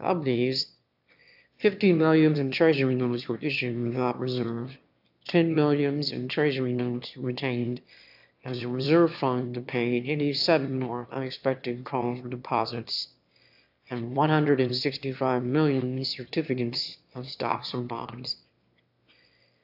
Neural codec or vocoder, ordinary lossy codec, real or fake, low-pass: autoencoder, 22.05 kHz, a latent of 192 numbers a frame, VITS, trained on one speaker; AAC, 48 kbps; fake; 5.4 kHz